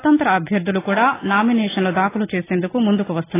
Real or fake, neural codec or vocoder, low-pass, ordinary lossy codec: real; none; 3.6 kHz; AAC, 16 kbps